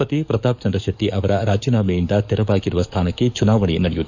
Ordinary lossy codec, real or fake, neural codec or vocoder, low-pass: none; fake; codec, 44.1 kHz, 7.8 kbps, DAC; 7.2 kHz